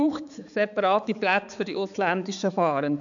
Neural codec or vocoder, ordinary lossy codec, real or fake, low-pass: codec, 16 kHz, 4 kbps, X-Codec, HuBERT features, trained on balanced general audio; none; fake; 7.2 kHz